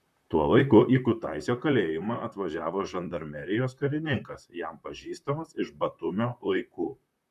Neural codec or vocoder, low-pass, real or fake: vocoder, 44.1 kHz, 128 mel bands, Pupu-Vocoder; 14.4 kHz; fake